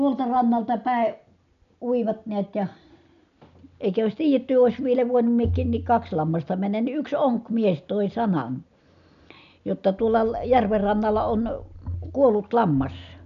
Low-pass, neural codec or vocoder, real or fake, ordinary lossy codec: 7.2 kHz; none; real; none